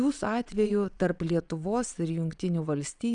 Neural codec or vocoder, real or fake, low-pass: vocoder, 22.05 kHz, 80 mel bands, Vocos; fake; 9.9 kHz